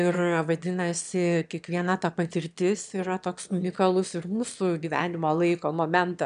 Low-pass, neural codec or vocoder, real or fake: 9.9 kHz; autoencoder, 22.05 kHz, a latent of 192 numbers a frame, VITS, trained on one speaker; fake